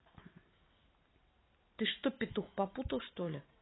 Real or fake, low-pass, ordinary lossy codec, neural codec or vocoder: real; 7.2 kHz; AAC, 16 kbps; none